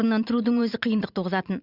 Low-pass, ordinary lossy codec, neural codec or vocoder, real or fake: 5.4 kHz; Opus, 64 kbps; vocoder, 44.1 kHz, 128 mel bands every 512 samples, BigVGAN v2; fake